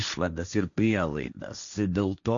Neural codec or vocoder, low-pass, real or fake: codec, 16 kHz, 1.1 kbps, Voila-Tokenizer; 7.2 kHz; fake